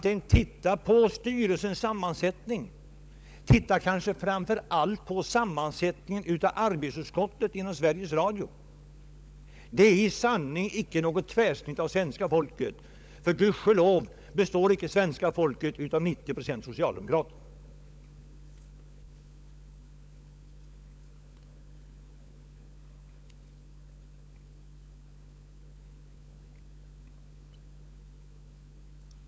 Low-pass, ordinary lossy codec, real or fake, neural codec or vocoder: none; none; fake; codec, 16 kHz, 16 kbps, FunCodec, trained on LibriTTS, 50 frames a second